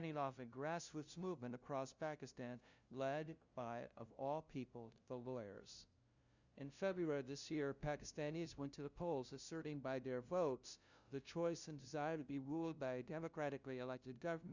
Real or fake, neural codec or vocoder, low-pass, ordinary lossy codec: fake; codec, 16 kHz, 0.5 kbps, FunCodec, trained on LibriTTS, 25 frames a second; 7.2 kHz; Opus, 64 kbps